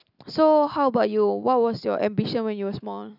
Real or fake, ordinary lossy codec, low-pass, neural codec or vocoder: real; none; 5.4 kHz; none